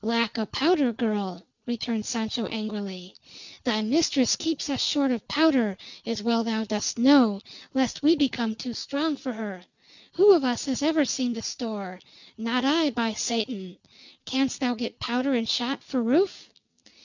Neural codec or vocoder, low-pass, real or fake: none; 7.2 kHz; real